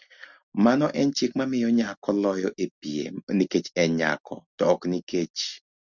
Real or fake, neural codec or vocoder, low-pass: real; none; 7.2 kHz